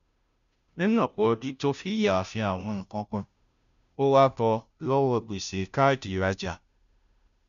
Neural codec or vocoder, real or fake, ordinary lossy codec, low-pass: codec, 16 kHz, 0.5 kbps, FunCodec, trained on Chinese and English, 25 frames a second; fake; none; 7.2 kHz